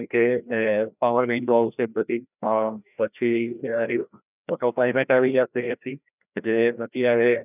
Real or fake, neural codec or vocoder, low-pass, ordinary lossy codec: fake; codec, 16 kHz, 1 kbps, FreqCodec, larger model; 3.6 kHz; none